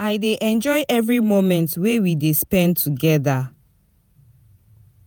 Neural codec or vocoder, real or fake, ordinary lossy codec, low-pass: vocoder, 48 kHz, 128 mel bands, Vocos; fake; none; none